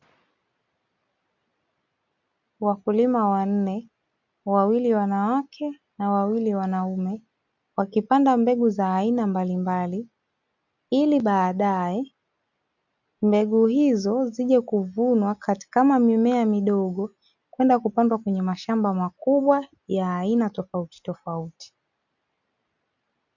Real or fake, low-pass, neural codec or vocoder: real; 7.2 kHz; none